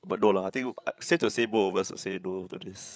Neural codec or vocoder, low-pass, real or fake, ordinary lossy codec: codec, 16 kHz, 8 kbps, FreqCodec, larger model; none; fake; none